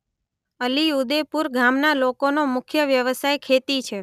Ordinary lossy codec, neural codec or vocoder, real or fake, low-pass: none; none; real; 14.4 kHz